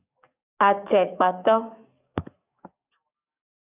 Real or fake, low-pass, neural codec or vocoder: fake; 3.6 kHz; codec, 44.1 kHz, 7.8 kbps, DAC